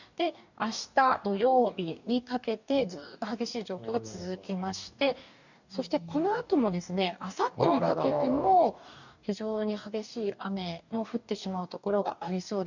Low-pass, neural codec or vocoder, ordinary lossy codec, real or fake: 7.2 kHz; codec, 44.1 kHz, 2.6 kbps, DAC; none; fake